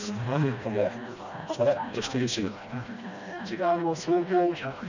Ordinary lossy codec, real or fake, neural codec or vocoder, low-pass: none; fake; codec, 16 kHz, 1 kbps, FreqCodec, smaller model; 7.2 kHz